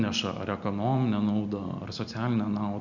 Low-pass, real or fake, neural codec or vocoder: 7.2 kHz; real; none